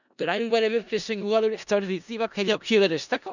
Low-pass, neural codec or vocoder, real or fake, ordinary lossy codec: 7.2 kHz; codec, 16 kHz in and 24 kHz out, 0.4 kbps, LongCat-Audio-Codec, four codebook decoder; fake; none